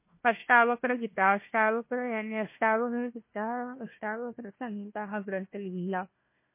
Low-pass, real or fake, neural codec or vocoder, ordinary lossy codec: 3.6 kHz; fake; codec, 16 kHz, 1 kbps, FunCodec, trained on Chinese and English, 50 frames a second; MP3, 24 kbps